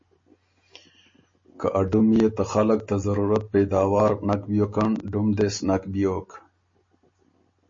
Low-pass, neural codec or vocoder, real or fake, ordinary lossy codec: 7.2 kHz; none; real; MP3, 32 kbps